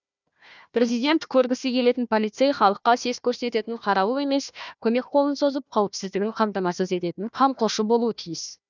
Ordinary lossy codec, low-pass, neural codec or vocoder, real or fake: none; 7.2 kHz; codec, 16 kHz, 1 kbps, FunCodec, trained on Chinese and English, 50 frames a second; fake